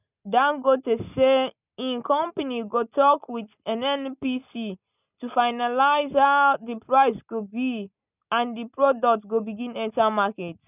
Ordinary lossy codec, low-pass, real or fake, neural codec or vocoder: none; 3.6 kHz; real; none